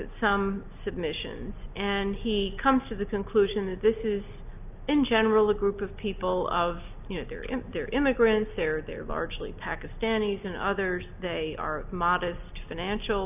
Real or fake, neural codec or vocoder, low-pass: real; none; 3.6 kHz